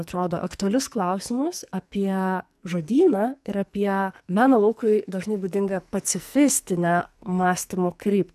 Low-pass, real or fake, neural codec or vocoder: 14.4 kHz; fake; codec, 44.1 kHz, 2.6 kbps, SNAC